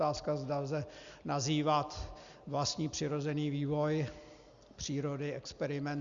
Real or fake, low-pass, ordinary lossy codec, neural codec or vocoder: real; 7.2 kHz; Opus, 64 kbps; none